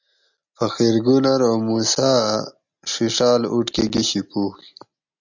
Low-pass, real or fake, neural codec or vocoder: 7.2 kHz; real; none